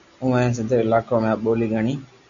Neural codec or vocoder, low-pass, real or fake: none; 7.2 kHz; real